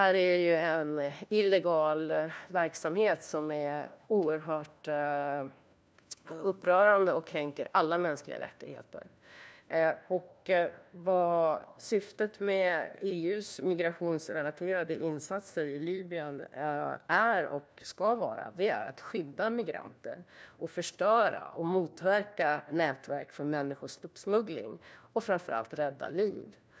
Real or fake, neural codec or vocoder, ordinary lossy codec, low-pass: fake; codec, 16 kHz, 1 kbps, FunCodec, trained on LibriTTS, 50 frames a second; none; none